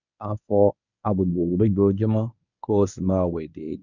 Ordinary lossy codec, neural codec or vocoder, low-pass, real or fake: none; codec, 24 kHz, 0.9 kbps, WavTokenizer, medium speech release version 1; 7.2 kHz; fake